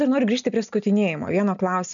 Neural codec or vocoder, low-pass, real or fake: none; 7.2 kHz; real